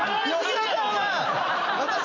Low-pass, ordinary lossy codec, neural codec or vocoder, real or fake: 7.2 kHz; none; none; real